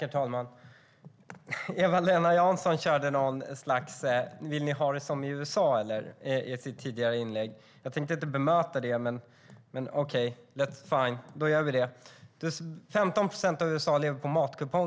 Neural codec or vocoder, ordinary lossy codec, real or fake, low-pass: none; none; real; none